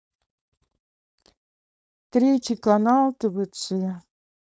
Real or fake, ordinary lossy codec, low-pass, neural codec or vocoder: fake; none; none; codec, 16 kHz, 4.8 kbps, FACodec